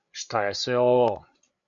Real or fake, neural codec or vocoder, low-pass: fake; codec, 16 kHz, 8 kbps, FreqCodec, larger model; 7.2 kHz